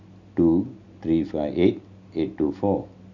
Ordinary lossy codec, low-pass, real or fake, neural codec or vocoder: none; 7.2 kHz; real; none